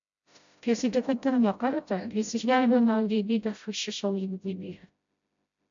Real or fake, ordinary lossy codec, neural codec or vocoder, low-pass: fake; MP3, 64 kbps; codec, 16 kHz, 0.5 kbps, FreqCodec, smaller model; 7.2 kHz